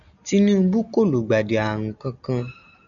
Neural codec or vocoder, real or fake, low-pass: none; real; 7.2 kHz